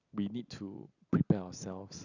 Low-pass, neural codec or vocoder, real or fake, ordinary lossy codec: 7.2 kHz; none; real; Opus, 64 kbps